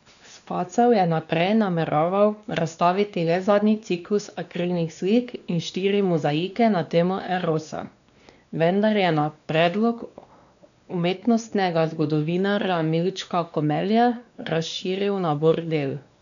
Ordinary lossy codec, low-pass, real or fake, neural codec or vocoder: none; 7.2 kHz; fake; codec, 16 kHz, 2 kbps, X-Codec, WavLM features, trained on Multilingual LibriSpeech